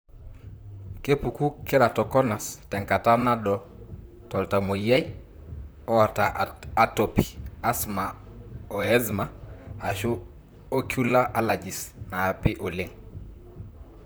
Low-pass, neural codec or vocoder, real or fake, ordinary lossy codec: none; vocoder, 44.1 kHz, 128 mel bands, Pupu-Vocoder; fake; none